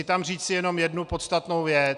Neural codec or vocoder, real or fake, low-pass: none; real; 10.8 kHz